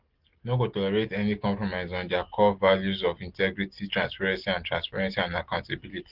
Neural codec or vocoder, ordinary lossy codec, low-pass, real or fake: none; Opus, 16 kbps; 5.4 kHz; real